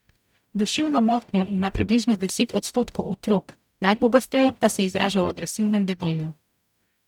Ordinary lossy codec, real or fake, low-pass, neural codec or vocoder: none; fake; 19.8 kHz; codec, 44.1 kHz, 0.9 kbps, DAC